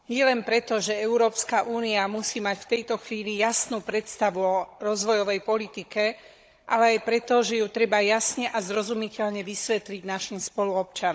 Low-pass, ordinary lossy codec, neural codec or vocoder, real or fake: none; none; codec, 16 kHz, 16 kbps, FunCodec, trained on Chinese and English, 50 frames a second; fake